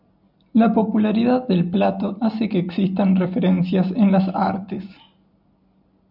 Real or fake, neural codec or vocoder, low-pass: real; none; 5.4 kHz